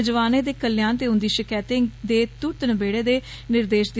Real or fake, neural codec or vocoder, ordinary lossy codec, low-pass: real; none; none; none